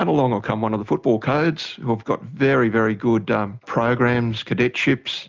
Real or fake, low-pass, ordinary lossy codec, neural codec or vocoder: real; 7.2 kHz; Opus, 24 kbps; none